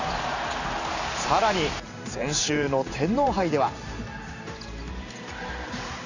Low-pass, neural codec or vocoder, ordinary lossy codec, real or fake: 7.2 kHz; vocoder, 44.1 kHz, 128 mel bands every 512 samples, BigVGAN v2; none; fake